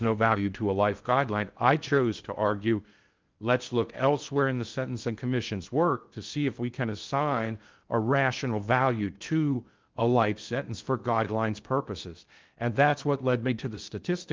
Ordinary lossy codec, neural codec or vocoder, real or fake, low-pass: Opus, 32 kbps; codec, 16 kHz in and 24 kHz out, 0.6 kbps, FocalCodec, streaming, 2048 codes; fake; 7.2 kHz